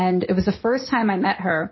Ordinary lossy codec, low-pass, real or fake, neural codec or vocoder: MP3, 24 kbps; 7.2 kHz; real; none